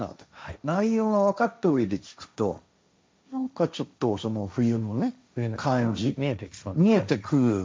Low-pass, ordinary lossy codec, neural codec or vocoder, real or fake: none; none; codec, 16 kHz, 1.1 kbps, Voila-Tokenizer; fake